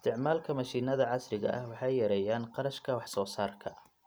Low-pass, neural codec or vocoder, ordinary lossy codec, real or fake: none; none; none; real